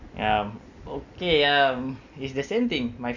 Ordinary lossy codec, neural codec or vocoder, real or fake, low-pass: none; none; real; 7.2 kHz